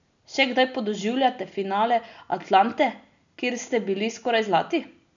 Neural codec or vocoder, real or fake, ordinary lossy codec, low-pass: none; real; none; 7.2 kHz